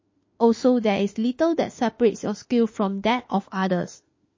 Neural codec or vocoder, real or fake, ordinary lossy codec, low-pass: autoencoder, 48 kHz, 32 numbers a frame, DAC-VAE, trained on Japanese speech; fake; MP3, 32 kbps; 7.2 kHz